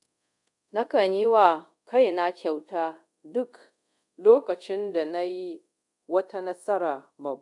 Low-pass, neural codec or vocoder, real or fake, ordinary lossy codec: 10.8 kHz; codec, 24 kHz, 0.5 kbps, DualCodec; fake; none